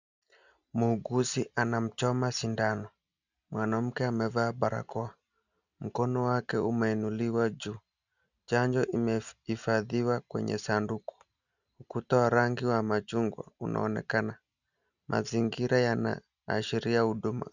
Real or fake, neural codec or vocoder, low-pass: real; none; 7.2 kHz